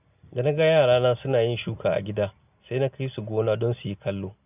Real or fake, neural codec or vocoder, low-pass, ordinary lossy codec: real; none; 3.6 kHz; none